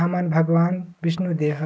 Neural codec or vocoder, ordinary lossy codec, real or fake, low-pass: none; none; real; none